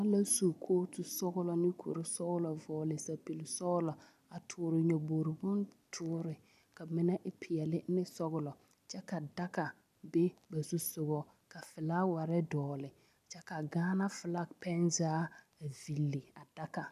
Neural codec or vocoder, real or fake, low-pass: none; real; 14.4 kHz